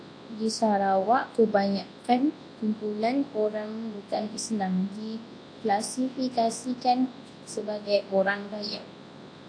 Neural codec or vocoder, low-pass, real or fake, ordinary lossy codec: codec, 24 kHz, 0.9 kbps, WavTokenizer, large speech release; 9.9 kHz; fake; AAC, 48 kbps